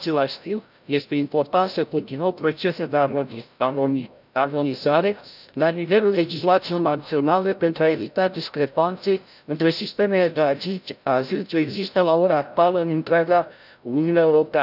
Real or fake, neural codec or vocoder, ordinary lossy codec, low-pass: fake; codec, 16 kHz, 0.5 kbps, FreqCodec, larger model; none; 5.4 kHz